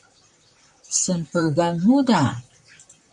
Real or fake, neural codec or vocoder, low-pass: fake; vocoder, 44.1 kHz, 128 mel bands, Pupu-Vocoder; 10.8 kHz